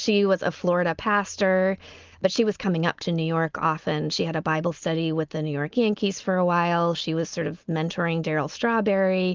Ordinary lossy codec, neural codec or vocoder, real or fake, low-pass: Opus, 24 kbps; none; real; 7.2 kHz